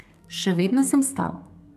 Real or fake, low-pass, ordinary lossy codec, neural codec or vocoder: fake; 14.4 kHz; AAC, 96 kbps; codec, 32 kHz, 1.9 kbps, SNAC